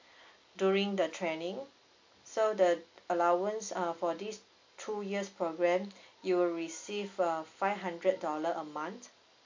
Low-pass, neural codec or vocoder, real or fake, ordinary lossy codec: 7.2 kHz; none; real; MP3, 48 kbps